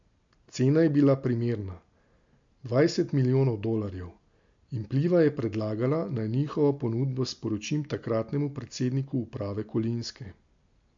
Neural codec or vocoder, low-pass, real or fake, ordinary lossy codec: none; 7.2 kHz; real; MP3, 48 kbps